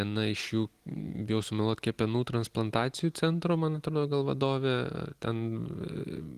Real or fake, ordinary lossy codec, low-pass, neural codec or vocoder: real; Opus, 24 kbps; 14.4 kHz; none